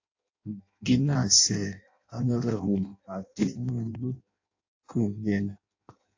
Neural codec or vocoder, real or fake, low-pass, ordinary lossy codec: codec, 16 kHz in and 24 kHz out, 0.6 kbps, FireRedTTS-2 codec; fake; 7.2 kHz; AAC, 48 kbps